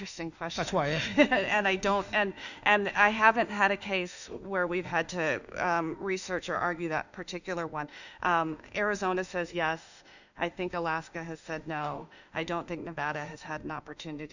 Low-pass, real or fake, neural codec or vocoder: 7.2 kHz; fake; autoencoder, 48 kHz, 32 numbers a frame, DAC-VAE, trained on Japanese speech